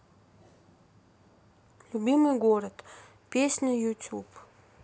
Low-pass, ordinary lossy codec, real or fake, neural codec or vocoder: none; none; real; none